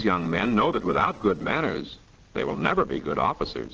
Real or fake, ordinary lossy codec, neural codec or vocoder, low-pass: fake; Opus, 16 kbps; vocoder, 22.05 kHz, 80 mel bands, Vocos; 7.2 kHz